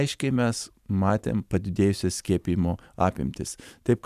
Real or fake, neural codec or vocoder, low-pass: fake; vocoder, 44.1 kHz, 128 mel bands every 512 samples, BigVGAN v2; 14.4 kHz